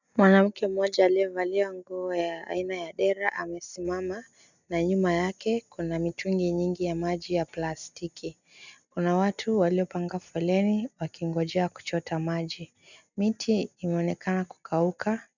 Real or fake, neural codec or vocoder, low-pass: real; none; 7.2 kHz